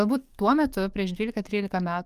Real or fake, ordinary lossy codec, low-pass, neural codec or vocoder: fake; Opus, 32 kbps; 14.4 kHz; codec, 44.1 kHz, 7.8 kbps, DAC